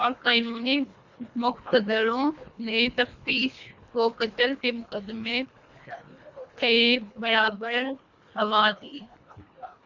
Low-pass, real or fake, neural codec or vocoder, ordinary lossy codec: 7.2 kHz; fake; codec, 24 kHz, 1.5 kbps, HILCodec; Opus, 64 kbps